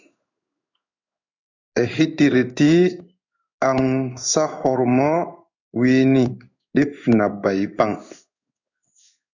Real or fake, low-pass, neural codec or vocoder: fake; 7.2 kHz; codec, 16 kHz in and 24 kHz out, 1 kbps, XY-Tokenizer